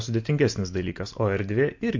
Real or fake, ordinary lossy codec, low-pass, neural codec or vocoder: real; MP3, 48 kbps; 7.2 kHz; none